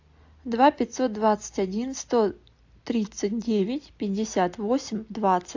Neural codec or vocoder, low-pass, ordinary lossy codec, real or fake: none; 7.2 kHz; AAC, 48 kbps; real